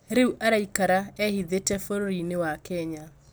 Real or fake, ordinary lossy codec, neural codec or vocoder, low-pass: real; none; none; none